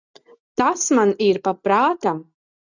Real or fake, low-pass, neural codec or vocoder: real; 7.2 kHz; none